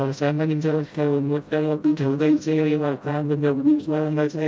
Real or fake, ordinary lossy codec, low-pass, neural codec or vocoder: fake; none; none; codec, 16 kHz, 0.5 kbps, FreqCodec, smaller model